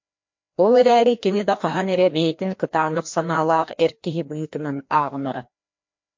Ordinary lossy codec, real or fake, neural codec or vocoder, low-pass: MP3, 48 kbps; fake; codec, 16 kHz, 1 kbps, FreqCodec, larger model; 7.2 kHz